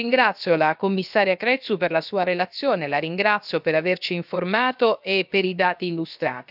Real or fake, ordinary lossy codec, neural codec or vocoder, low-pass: fake; none; codec, 16 kHz, about 1 kbps, DyCAST, with the encoder's durations; 5.4 kHz